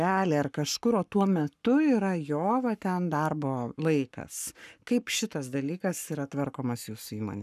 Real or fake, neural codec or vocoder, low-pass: fake; codec, 44.1 kHz, 7.8 kbps, Pupu-Codec; 14.4 kHz